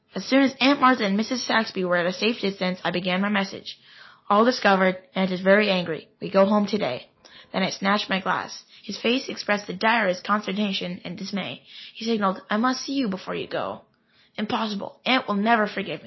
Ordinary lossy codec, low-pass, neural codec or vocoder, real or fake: MP3, 24 kbps; 7.2 kHz; none; real